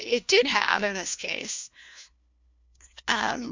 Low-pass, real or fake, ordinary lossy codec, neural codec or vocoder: 7.2 kHz; fake; MP3, 64 kbps; codec, 16 kHz, 1 kbps, FunCodec, trained on LibriTTS, 50 frames a second